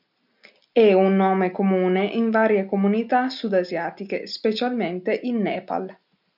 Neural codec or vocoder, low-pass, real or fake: none; 5.4 kHz; real